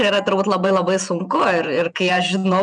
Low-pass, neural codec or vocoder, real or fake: 10.8 kHz; vocoder, 48 kHz, 128 mel bands, Vocos; fake